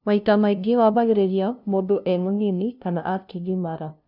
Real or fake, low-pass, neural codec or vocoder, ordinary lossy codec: fake; 5.4 kHz; codec, 16 kHz, 0.5 kbps, FunCodec, trained on LibriTTS, 25 frames a second; none